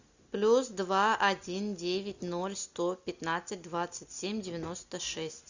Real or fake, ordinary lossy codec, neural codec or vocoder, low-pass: real; Opus, 64 kbps; none; 7.2 kHz